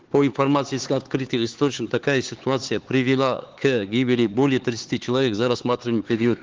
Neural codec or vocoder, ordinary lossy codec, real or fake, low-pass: codec, 16 kHz, 4 kbps, X-Codec, HuBERT features, trained on LibriSpeech; Opus, 16 kbps; fake; 7.2 kHz